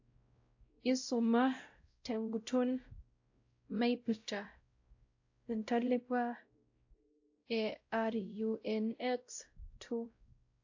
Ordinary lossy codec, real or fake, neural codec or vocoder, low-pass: AAC, 48 kbps; fake; codec, 16 kHz, 0.5 kbps, X-Codec, WavLM features, trained on Multilingual LibriSpeech; 7.2 kHz